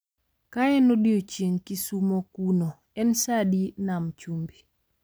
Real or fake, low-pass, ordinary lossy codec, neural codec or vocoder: real; none; none; none